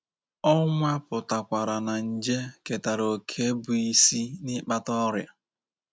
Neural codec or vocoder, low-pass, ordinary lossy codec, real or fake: none; none; none; real